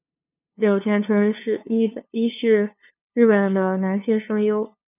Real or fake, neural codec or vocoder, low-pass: fake; codec, 16 kHz, 2 kbps, FunCodec, trained on LibriTTS, 25 frames a second; 3.6 kHz